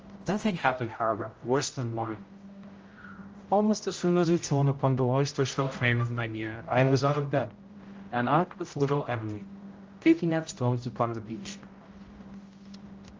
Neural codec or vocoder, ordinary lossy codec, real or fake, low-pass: codec, 16 kHz, 0.5 kbps, X-Codec, HuBERT features, trained on general audio; Opus, 24 kbps; fake; 7.2 kHz